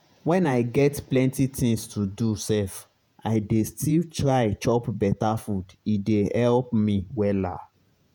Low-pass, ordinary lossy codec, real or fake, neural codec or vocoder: none; none; fake; vocoder, 48 kHz, 128 mel bands, Vocos